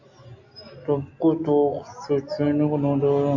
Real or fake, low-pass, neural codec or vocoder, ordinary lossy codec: real; 7.2 kHz; none; AAC, 48 kbps